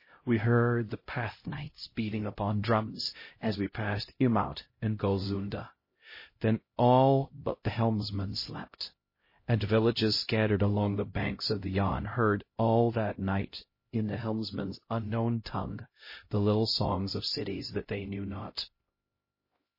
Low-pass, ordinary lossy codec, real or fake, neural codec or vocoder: 5.4 kHz; MP3, 24 kbps; fake; codec, 16 kHz, 0.5 kbps, X-Codec, HuBERT features, trained on LibriSpeech